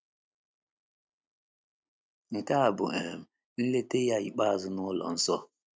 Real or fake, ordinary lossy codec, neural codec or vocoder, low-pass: real; none; none; none